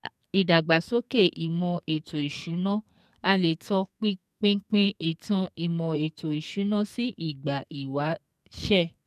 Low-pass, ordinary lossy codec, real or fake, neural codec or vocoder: 14.4 kHz; MP3, 96 kbps; fake; codec, 44.1 kHz, 2.6 kbps, SNAC